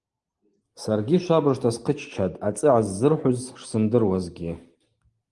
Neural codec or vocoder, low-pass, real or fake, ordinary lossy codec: none; 10.8 kHz; real; Opus, 24 kbps